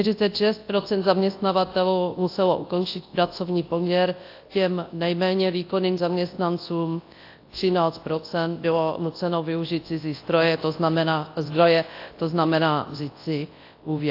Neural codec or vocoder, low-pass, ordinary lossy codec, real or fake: codec, 24 kHz, 0.9 kbps, WavTokenizer, large speech release; 5.4 kHz; AAC, 32 kbps; fake